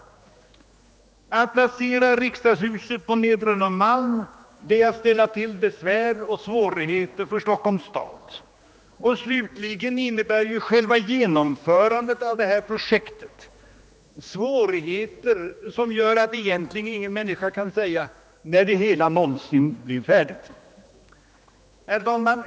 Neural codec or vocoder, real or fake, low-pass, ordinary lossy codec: codec, 16 kHz, 2 kbps, X-Codec, HuBERT features, trained on general audio; fake; none; none